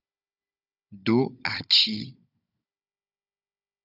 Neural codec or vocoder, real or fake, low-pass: codec, 16 kHz, 16 kbps, FunCodec, trained on Chinese and English, 50 frames a second; fake; 5.4 kHz